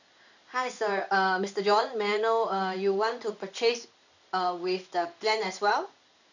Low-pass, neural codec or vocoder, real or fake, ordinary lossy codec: 7.2 kHz; codec, 16 kHz in and 24 kHz out, 1 kbps, XY-Tokenizer; fake; none